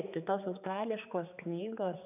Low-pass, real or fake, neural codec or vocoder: 3.6 kHz; fake; codec, 16 kHz, 4 kbps, X-Codec, HuBERT features, trained on general audio